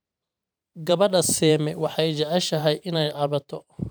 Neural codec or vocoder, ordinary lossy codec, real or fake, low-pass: vocoder, 44.1 kHz, 128 mel bands every 512 samples, BigVGAN v2; none; fake; none